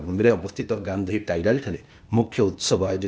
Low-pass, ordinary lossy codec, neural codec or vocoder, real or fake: none; none; codec, 16 kHz, 0.8 kbps, ZipCodec; fake